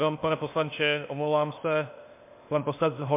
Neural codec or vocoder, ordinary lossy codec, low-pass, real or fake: codec, 16 kHz, 0.8 kbps, ZipCodec; MP3, 24 kbps; 3.6 kHz; fake